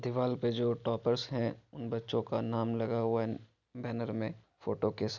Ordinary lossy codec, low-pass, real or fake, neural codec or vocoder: none; 7.2 kHz; real; none